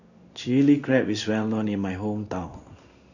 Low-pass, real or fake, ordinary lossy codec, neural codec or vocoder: 7.2 kHz; fake; none; codec, 16 kHz in and 24 kHz out, 1 kbps, XY-Tokenizer